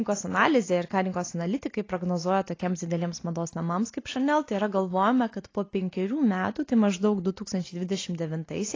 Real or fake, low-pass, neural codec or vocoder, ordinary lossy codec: real; 7.2 kHz; none; AAC, 32 kbps